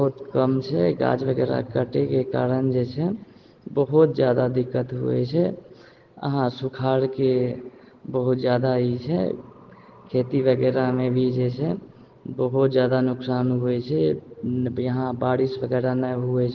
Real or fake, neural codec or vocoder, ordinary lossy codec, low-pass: real; none; Opus, 16 kbps; 7.2 kHz